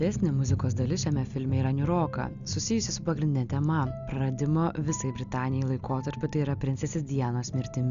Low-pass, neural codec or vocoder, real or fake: 7.2 kHz; none; real